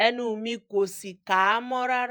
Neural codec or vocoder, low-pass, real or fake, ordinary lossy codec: vocoder, 48 kHz, 128 mel bands, Vocos; none; fake; none